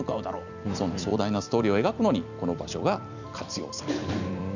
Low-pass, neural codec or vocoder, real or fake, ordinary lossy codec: 7.2 kHz; vocoder, 44.1 kHz, 128 mel bands every 256 samples, BigVGAN v2; fake; none